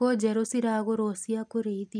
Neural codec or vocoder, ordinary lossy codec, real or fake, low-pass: none; none; real; 9.9 kHz